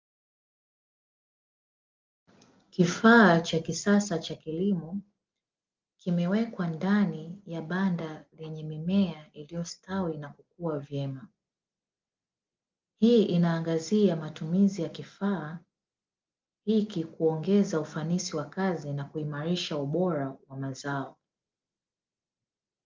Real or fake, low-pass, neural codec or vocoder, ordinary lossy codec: real; 7.2 kHz; none; Opus, 32 kbps